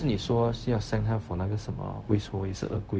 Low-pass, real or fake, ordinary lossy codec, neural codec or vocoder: none; fake; none; codec, 16 kHz, 0.4 kbps, LongCat-Audio-Codec